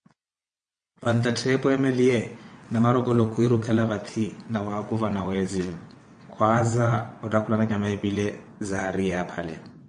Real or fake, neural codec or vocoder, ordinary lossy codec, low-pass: fake; vocoder, 22.05 kHz, 80 mel bands, WaveNeXt; MP3, 48 kbps; 9.9 kHz